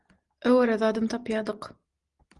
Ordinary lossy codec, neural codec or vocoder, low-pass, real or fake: Opus, 24 kbps; none; 10.8 kHz; real